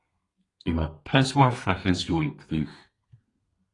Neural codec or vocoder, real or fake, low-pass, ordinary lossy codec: codec, 32 kHz, 1.9 kbps, SNAC; fake; 10.8 kHz; AAC, 32 kbps